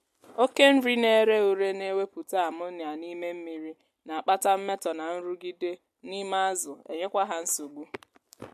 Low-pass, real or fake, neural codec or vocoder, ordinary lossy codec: 14.4 kHz; real; none; MP3, 64 kbps